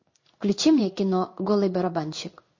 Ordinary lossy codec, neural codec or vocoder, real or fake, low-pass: MP3, 32 kbps; codec, 16 kHz in and 24 kHz out, 1 kbps, XY-Tokenizer; fake; 7.2 kHz